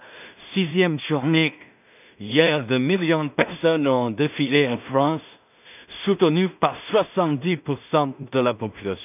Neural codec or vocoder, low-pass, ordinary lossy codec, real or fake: codec, 16 kHz in and 24 kHz out, 0.4 kbps, LongCat-Audio-Codec, two codebook decoder; 3.6 kHz; none; fake